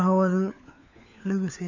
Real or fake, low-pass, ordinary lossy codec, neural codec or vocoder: fake; 7.2 kHz; none; codec, 16 kHz, 4 kbps, FunCodec, trained on LibriTTS, 50 frames a second